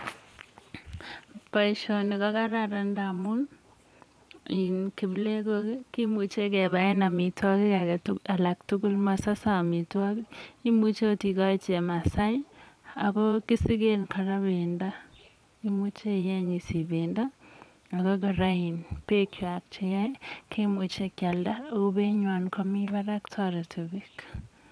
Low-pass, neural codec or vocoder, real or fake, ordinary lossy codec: none; vocoder, 22.05 kHz, 80 mel bands, Vocos; fake; none